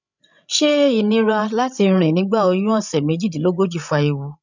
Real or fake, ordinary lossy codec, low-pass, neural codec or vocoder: fake; none; 7.2 kHz; codec, 16 kHz, 16 kbps, FreqCodec, larger model